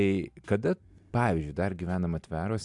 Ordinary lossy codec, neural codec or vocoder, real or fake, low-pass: AAC, 64 kbps; none; real; 10.8 kHz